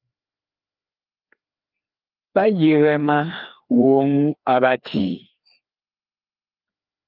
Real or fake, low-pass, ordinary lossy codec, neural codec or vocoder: fake; 5.4 kHz; Opus, 32 kbps; codec, 16 kHz, 2 kbps, FreqCodec, larger model